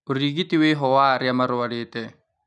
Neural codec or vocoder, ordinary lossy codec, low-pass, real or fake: none; none; 10.8 kHz; real